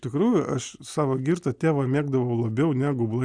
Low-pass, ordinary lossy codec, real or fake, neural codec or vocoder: 9.9 kHz; Opus, 64 kbps; real; none